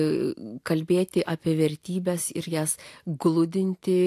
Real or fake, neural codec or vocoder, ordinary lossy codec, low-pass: real; none; AAC, 64 kbps; 14.4 kHz